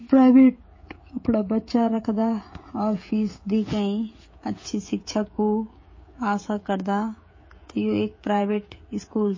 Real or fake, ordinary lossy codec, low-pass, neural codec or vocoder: fake; MP3, 32 kbps; 7.2 kHz; codec, 16 kHz, 16 kbps, FreqCodec, smaller model